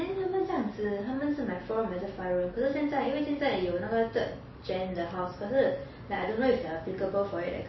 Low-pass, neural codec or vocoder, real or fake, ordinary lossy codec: 7.2 kHz; none; real; MP3, 24 kbps